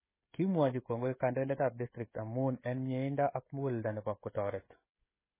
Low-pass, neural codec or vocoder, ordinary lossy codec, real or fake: 3.6 kHz; codec, 16 kHz, 16 kbps, FreqCodec, smaller model; MP3, 16 kbps; fake